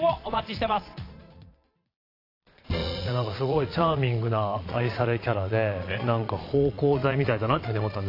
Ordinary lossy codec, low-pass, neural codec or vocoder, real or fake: AAC, 32 kbps; 5.4 kHz; vocoder, 22.05 kHz, 80 mel bands, Vocos; fake